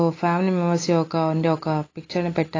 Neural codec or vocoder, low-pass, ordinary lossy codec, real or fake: none; 7.2 kHz; AAC, 32 kbps; real